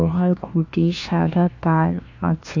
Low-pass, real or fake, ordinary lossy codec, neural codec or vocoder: 7.2 kHz; fake; AAC, 48 kbps; codec, 16 kHz, 1 kbps, FunCodec, trained on LibriTTS, 50 frames a second